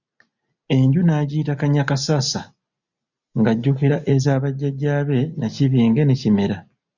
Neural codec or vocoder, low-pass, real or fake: none; 7.2 kHz; real